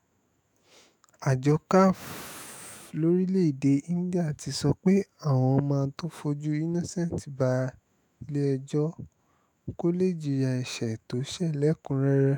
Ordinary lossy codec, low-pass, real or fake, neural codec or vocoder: none; none; fake; autoencoder, 48 kHz, 128 numbers a frame, DAC-VAE, trained on Japanese speech